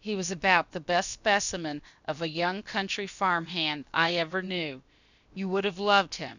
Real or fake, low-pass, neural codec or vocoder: fake; 7.2 kHz; codec, 16 kHz, about 1 kbps, DyCAST, with the encoder's durations